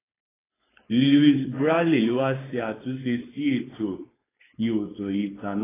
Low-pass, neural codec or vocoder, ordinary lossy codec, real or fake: 3.6 kHz; codec, 16 kHz, 4.8 kbps, FACodec; AAC, 16 kbps; fake